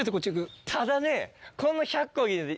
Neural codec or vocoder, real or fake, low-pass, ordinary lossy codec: none; real; none; none